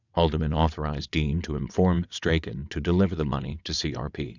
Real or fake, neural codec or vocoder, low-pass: fake; vocoder, 22.05 kHz, 80 mel bands, WaveNeXt; 7.2 kHz